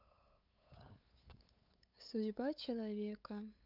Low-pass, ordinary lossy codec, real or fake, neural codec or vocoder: 5.4 kHz; none; fake; codec, 16 kHz, 8 kbps, FunCodec, trained on LibriTTS, 25 frames a second